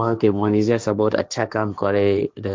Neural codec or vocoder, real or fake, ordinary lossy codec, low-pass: codec, 16 kHz, 1.1 kbps, Voila-Tokenizer; fake; none; none